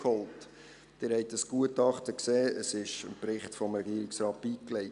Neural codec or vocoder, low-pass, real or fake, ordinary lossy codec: none; 10.8 kHz; real; none